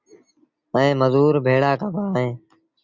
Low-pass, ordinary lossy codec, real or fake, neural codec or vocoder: 7.2 kHz; Opus, 64 kbps; real; none